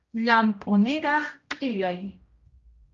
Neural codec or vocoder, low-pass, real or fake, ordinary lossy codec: codec, 16 kHz, 0.5 kbps, X-Codec, HuBERT features, trained on general audio; 7.2 kHz; fake; Opus, 32 kbps